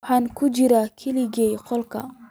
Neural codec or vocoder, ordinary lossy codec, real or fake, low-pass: none; none; real; none